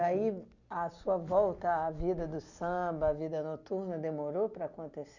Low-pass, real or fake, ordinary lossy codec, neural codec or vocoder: 7.2 kHz; real; none; none